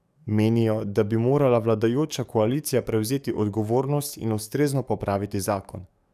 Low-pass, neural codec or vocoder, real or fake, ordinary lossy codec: 14.4 kHz; codec, 44.1 kHz, 7.8 kbps, DAC; fake; none